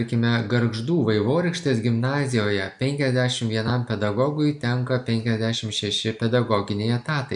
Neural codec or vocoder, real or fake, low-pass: none; real; 10.8 kHz